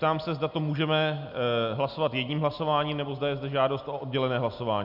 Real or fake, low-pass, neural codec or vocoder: real; 5.4 kHz; none